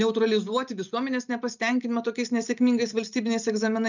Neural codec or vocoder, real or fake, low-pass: none; real; 7.2 kHz